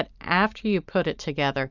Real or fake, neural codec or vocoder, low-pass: real; none; 7.2 kHz